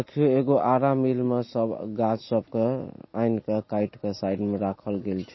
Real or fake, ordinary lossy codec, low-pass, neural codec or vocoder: real; MP3, 24 kbps; 7.2 kHz; none